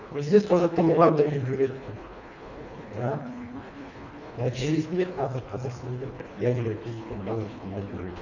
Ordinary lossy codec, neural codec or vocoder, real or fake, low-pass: none; codec, 24 kHz, 1.5 kbps, HILCodec; fake; 7.2 kHz